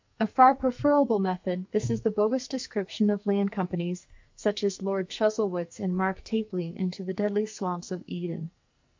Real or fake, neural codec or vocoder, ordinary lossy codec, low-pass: fake; codec, 44.1 kHz, 2.6 kbps, SNAC; MP3, 64 kbps; 7.2 kHz